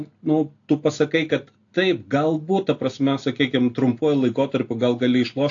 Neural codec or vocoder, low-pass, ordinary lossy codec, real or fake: none; 7.2 kHz; AAC, 48 kbps; real